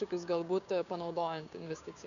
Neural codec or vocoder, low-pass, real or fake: codec, 16 kHz, 6 kbps, DAC; 7.2 kHz; fake